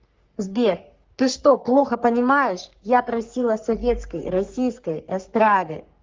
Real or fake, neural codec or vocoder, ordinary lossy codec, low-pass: fake; codec, 44.1 kHz, 3.4 kbps, Pupu-Codec; Opus, 32 kbps; 7.2 kHz